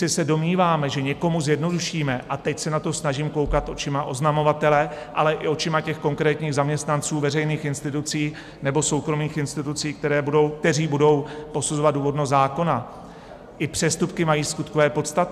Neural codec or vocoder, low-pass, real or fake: none; 14.4 kHz; real